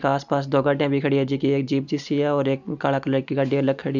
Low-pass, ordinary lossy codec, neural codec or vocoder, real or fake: 7.2 kHz; none; none; real